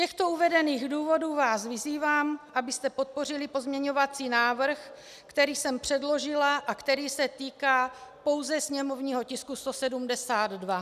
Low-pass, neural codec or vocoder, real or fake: 14.4 kHz; none; real